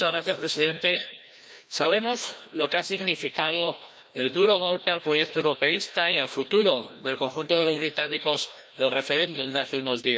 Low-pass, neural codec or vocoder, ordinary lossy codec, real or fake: none; codec, 16 kHz, 1 kbps, FreqCodec, larger model; none; fake